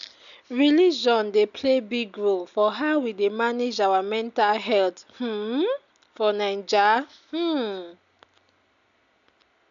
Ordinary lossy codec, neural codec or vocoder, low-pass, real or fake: AAC, 96 kbps; none; 7.2 kHz; real